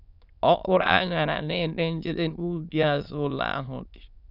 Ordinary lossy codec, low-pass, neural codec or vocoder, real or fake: none; 5.4 kHz; autoencoder, 22.05 kHz, a latent of 192 numbers a frame, VITS, trained on many speakers; fake